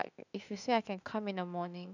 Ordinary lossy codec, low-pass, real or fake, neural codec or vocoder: none; 7.2 kHz; fake; autoencoder, 48 kHz, 32 numbers a frame, DAC-VAE, trained on Japanese speech